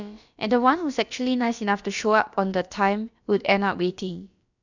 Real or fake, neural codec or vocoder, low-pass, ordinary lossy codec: fake; codec, 16 kHz, about 1 kbps, DyCAST, with the encoder's durations; 7.2 kHz; none